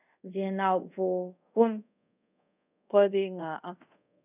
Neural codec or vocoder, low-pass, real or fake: codec, 24 kHz, 0.5 kbps, DualCodec; 3.6 kHz; fake